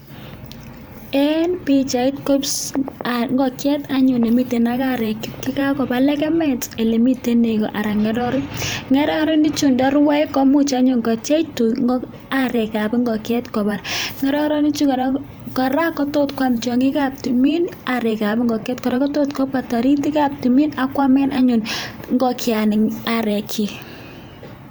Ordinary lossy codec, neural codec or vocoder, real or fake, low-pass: none; vocoder, 44.1 kHz, 128 mel bands every 512 samples, BigVGAN v2; fake; none